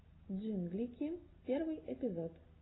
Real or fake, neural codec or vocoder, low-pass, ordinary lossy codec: real; none; 7.2 kHz; AAC, 16 kbps